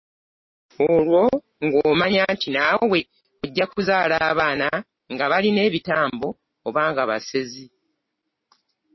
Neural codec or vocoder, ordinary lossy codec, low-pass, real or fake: none; MP3, 24 kbps; 7.2 kHz; real